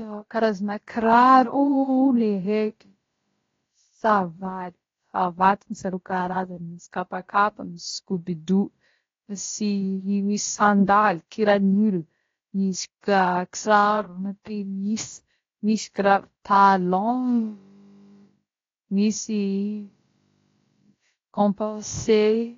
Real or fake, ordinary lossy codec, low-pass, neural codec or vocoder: fake; AAC, 32 kbps; 7.2 kHz; codec, 16 kHz, about 1 kbps, DyCAST, with the encoder's durations